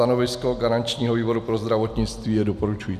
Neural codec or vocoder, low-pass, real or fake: none; 14.4 kHz; real